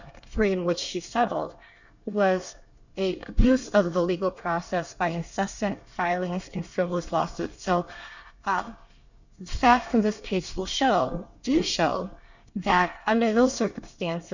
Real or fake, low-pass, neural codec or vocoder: fake; 7.2 kHz; codec, 24 kHz, 1 kbps, SNAC